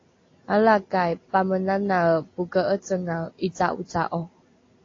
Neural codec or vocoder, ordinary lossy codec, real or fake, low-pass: none; AAC, 32 kbps; real; 7.2 kHz